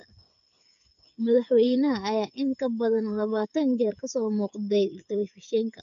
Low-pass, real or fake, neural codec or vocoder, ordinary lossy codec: 7.2 kHz; fake; codec, 16 kHz, 8 kbps, FreqCodec, smaller model; none